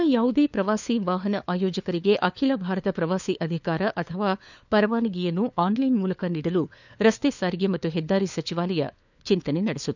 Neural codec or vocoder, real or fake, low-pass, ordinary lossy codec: codec, 16 kHz, 4 kbps, FunCodec, trained on LibriTTS, 50 frames a second; fake; 7.2 kHz; none